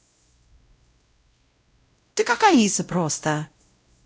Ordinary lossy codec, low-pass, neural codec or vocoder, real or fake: none; none; codec, 16 kHz, 0.5 kbps, X-Codec, WavLM features, trained on Multilingual LibriSpeech; fake